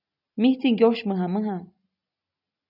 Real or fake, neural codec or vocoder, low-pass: real; none; 5.4 kHz